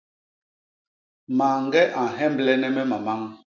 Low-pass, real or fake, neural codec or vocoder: 7.2 kHz; real; none